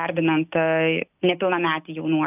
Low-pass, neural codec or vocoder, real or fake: 3.6 kHz; none; real